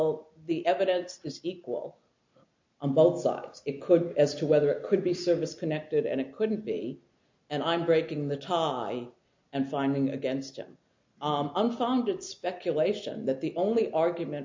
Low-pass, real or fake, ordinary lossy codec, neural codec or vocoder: 7.2 kHz; real; MP3, 48 kbps; none